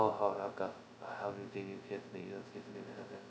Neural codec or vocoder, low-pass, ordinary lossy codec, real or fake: codec, 16 kHz, 0.2 kbps, FocalCodec; none; none; fake